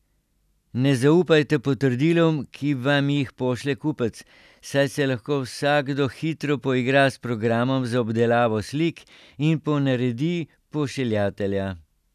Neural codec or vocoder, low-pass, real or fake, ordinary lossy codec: none; 14.4 kHz; real; none